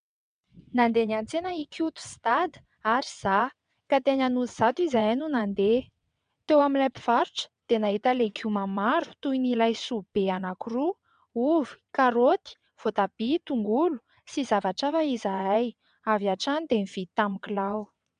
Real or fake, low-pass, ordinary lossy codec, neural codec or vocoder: fake; 9.9 kHz; MP3, 96 kbps; vocoder, 22.05 kHz, 80 mel bands, WaveNeXt